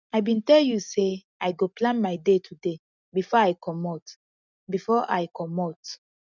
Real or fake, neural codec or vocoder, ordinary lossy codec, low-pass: real; none; none; 7.2 kHz